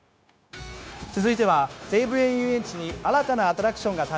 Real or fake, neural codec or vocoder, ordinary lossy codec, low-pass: fake; codec, 16 kHz, 0.9 kbps, LongCat-Audio-Codec; none; none